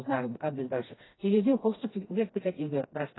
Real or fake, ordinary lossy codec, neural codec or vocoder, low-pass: fake; AAC, 16 kbps; codec, 16 kHz, 1 kbps, FreqCodec, smaller model; 7.2 kHz